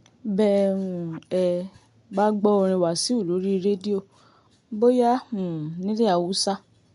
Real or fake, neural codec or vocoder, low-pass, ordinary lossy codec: real; none; 10.8 kHz; MP3, 64 kbps